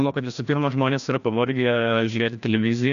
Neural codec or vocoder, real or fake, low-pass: codec, 16 kHz, 1 kbps, FreqCodec, larger model; fake; 7.2 kHz